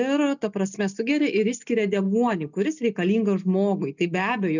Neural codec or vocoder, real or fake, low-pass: none; real; 7.2 kHz